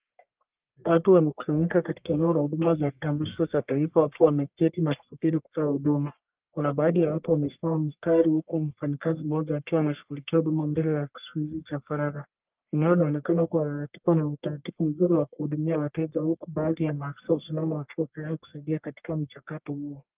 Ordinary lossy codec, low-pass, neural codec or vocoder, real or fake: Opus, 32 kbps; 3.6 kHz; codec, 44.1 kHz, 1.7 kbps, Pupu-Codec; fake